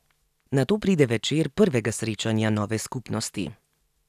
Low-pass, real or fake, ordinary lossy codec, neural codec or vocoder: 14.4 kHz; real; none; none